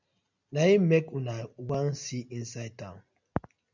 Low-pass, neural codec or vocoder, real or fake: 7.2 kHz; none; real